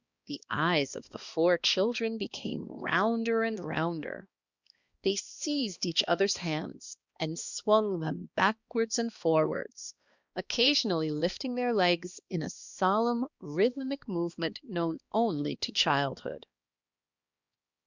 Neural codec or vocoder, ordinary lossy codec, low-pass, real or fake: codec, 16 kHz, 2 kbps, X-Codec, HuBERT features, trained on balanced general audio; Opus, 64 kbps; 7.2 kHz; fake